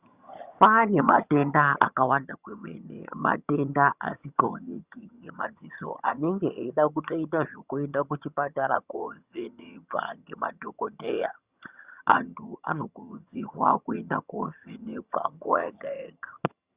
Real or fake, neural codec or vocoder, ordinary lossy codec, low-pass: fake; vocoder, 22.05 kHz, 80 mel bands, HiFi-GAN; Opus, 64 kbps; 3.6 kHz